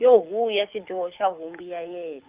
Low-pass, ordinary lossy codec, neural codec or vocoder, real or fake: 3.6 kHz; Opus, 24 kbps; codec, 24 kHz, 6 kbps, HILCodec; fake